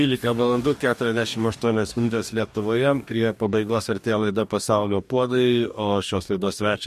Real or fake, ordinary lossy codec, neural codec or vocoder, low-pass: fake; MP3, 64 kbps; codec, 44.1 kHz, 2.6 kbps, DAC; 14.4 kHz